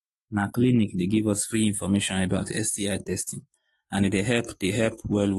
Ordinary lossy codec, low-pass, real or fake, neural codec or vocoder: AAC, 32 kbps; 19.8 kHz; fake; autoencoder, 48 kHz, 128 numbers a frame, DAC-VAE, trained on Japanese speech